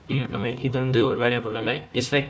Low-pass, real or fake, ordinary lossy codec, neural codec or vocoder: none; fake; none; codec, 16 kHz, 1 kbps, FunCodec, trained on Chinese and English, 50 frames a second